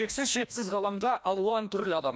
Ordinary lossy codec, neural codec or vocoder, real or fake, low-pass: none; codec, 16 kHz, 1 kbps, FreqCodec, larger model; fake; none